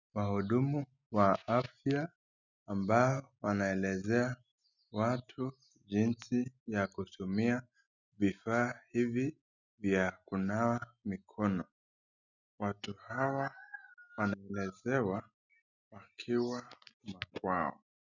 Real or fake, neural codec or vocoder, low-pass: real; none; 7.2 kHz